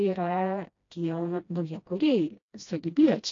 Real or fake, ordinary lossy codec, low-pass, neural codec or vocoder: fake; AAC, 48 kbps; 7.2 kHz; codec, 16 kHz, 1 kbps, FreqCodec, smaller model